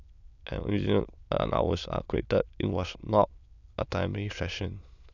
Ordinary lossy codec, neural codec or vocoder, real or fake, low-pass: none; autoencoder, 22.05 kHz, a latent of 192 numbers a frame, VITS, trained on many speakers; fake; 7.2 kHz